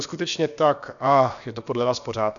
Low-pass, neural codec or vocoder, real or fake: 7.2 kHz; codec, 16 kHz, about 1 kbps, DyCAST, with the encoder's durations; fake